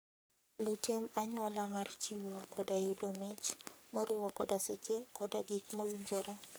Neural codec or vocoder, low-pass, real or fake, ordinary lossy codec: codec, 44.1 kHz, 3.4 kbps, Pupu-Codec; none; fake; none